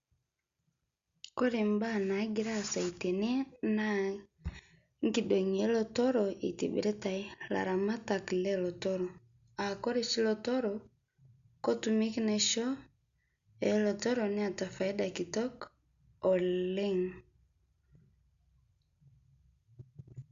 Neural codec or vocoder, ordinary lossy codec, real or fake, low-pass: none; Opus, 64 kbps; real; 7.2 kHz